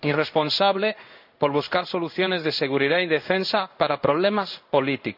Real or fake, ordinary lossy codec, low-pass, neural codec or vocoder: fake; none; 5.4 kHz; codec, 16 kHz in and 24 kHz out, 1 kbps, XY-Tokenizer